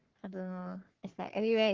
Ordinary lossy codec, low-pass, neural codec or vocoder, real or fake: Opus, 16 kbps; 7.2 kHz; codec, 44.1 kHz, 3.4 kbps, Pupu-Codec; fake